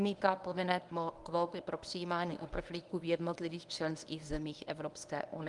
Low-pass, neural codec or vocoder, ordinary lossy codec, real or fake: 10.8 kHz; codec, 24 kHz, 0.9 kbps, WavTokenizer, medium speech release version 1; Opus, 32 kbps; fake